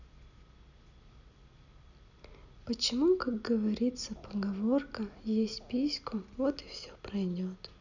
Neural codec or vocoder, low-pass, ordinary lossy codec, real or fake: none; 7.2 kHz; none; real